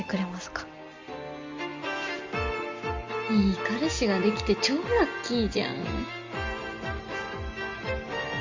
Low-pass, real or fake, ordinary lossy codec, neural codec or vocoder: 7.2 kHz; real; Opus, 32 kbps; none